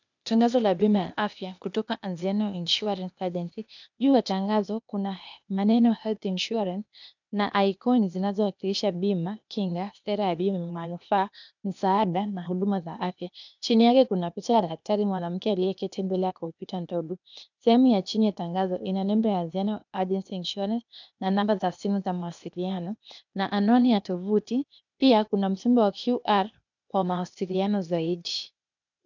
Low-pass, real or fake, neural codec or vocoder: 7.2 kHz; fake; codec, 16 kHz, 0.8 kbps, ZipCodec